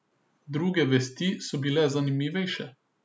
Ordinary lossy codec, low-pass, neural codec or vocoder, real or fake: none; none; none; real